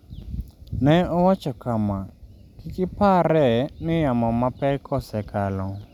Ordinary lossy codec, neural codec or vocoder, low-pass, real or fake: none; none; 19.8 kHz; real